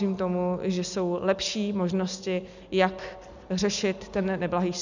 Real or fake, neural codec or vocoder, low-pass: real; none; 7.2 kHz